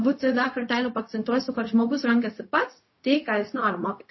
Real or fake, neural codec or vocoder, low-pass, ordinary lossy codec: fake; codec, 16 kHz, 0.4 kbps, LongCat-Audio-Codec; 7.2 kHz; MP3, 24 kbps